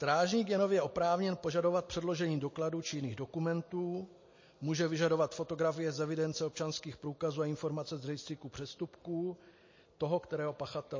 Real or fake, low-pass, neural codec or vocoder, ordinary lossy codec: real; 7.2 kHz; none; MP3, 32 kbps